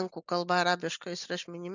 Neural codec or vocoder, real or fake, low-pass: none; real; 7.2 kHz